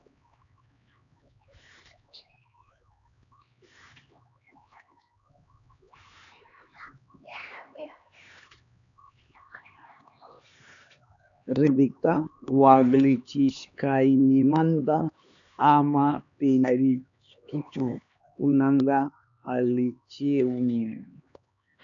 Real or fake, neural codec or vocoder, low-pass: fake; codec, 16 kHz, 2 kbps, X-Codec, HuBERT features, trained on LibriSpeech; 7.2 kHz